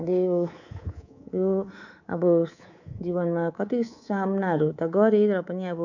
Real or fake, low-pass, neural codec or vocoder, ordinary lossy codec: fake; 7.2 kHz; codec, 24 kHz, 3.1 kbps, DualCodec; none